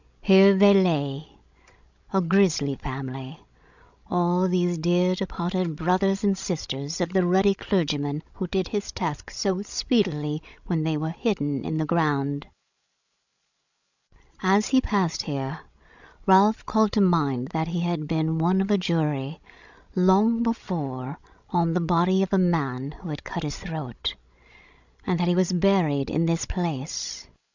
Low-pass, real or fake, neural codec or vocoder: 7.2 kHz; fake; codec, 16 kHz, 16 kbps, FreqCodec, larger model